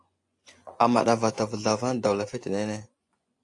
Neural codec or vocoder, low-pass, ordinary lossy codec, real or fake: vocoder, 24 kHz, 100 mel bands, Vocos; 10.8 kHz; AAC, 48 kbps; fake